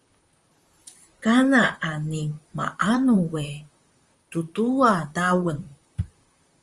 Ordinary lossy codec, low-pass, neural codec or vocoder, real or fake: Opus, 32 kbps; 10.8 kHz; vocoder, 44.1 kHz, 128 mel bands, Pupu-Vocoder; fake